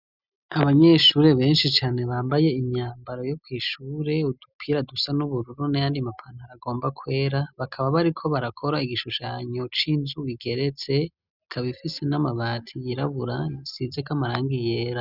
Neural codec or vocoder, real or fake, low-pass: none; real; 5.4 kHz